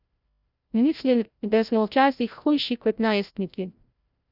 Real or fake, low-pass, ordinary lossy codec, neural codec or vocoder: fake; 5.4 kHz; none; codec, 16 kHz, 0.5 kbps, FreqCodec, larger model